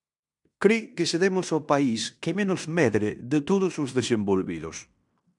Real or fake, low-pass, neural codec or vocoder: fake; 10.8 kHz; codec, 16 kHz in and 24 kHz out, 0.9 kbps, LongCat-Audio-Codec, fine tuned four codebook decoder